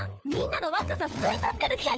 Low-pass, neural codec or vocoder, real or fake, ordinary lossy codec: none; codec, 16 kHz, 4 kbps, FunCodec, trained on LibriTTS, 50 frames a second; fake; none